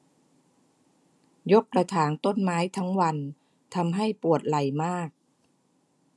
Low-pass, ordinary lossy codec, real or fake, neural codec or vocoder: none; none; real; none